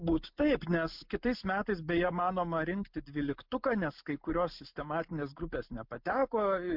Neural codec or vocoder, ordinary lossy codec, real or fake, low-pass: none; MP3, 48 kbps; real; 5.4 kHz